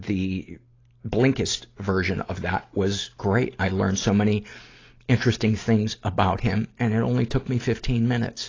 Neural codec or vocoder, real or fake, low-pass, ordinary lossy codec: none; real; 7.2 kHz; AAC, 32 kbps